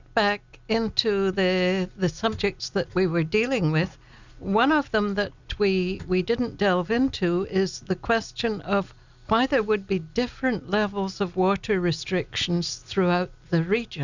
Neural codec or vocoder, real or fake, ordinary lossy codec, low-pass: none; real; Opus, 64 kbps; 7.2 kHz